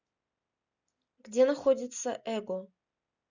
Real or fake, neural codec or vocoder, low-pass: real; none; 7.2 kHz